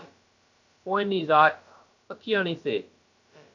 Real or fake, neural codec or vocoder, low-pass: fake; codec, 16 kHz, about 1 kbps, DyCAST, with the encoder's durations; 7.2 kHz